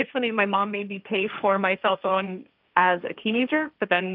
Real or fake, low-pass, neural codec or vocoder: fake; 5.4 kHz; codec, 16 kHz, 1.1 kbps, Voila-Tokenizer